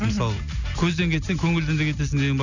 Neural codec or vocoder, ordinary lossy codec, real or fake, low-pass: none; none; real; 7.2 kHz